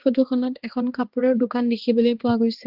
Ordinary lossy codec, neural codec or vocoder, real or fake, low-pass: Opus, 16 kbps; codec, 16 kHz, 2 kbps, X-Codec, HuBERT features, trained on balanced general audio; fake; 5.4 kHz